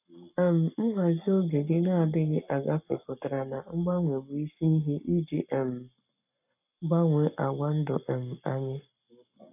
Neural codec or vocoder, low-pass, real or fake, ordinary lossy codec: codec, 44.1 kHz, 7.8 kbps, Pupu-Codec; 3.6 kHz; fake; none